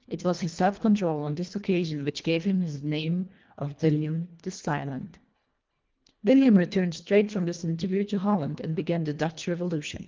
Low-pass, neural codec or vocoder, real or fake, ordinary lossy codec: 7.2 kHz; codec, 24 kHz, 1.5 kbps, HILCodec; fake; Opus, 32 kbps